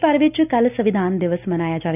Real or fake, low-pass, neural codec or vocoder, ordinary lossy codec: real; 3.6 kHz; none; none